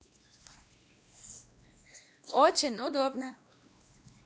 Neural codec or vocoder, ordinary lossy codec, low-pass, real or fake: codec, 16 kHz, 1 kbps, X-Codec, WavLM features, trained on Multilingual LibriSpeech; none; none; fake